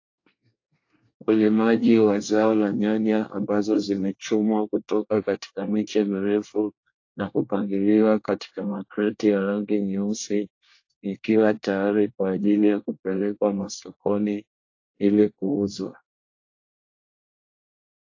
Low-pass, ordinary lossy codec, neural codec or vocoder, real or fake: 7.2 kHz; AAC, 48 kbps; codec, 24 kHz, 1 kbps, SNAC; fake